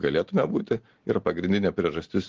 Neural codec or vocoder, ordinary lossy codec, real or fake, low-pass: none; Opus, 16 kbps; real; 7.2 kHz